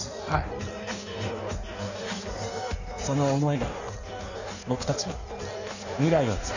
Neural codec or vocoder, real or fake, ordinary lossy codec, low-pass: codec, 16 kHz in and 24 kHz out, 1.1 kbps, FireRedTTS-2 codec; fake; none; 7.2 kHz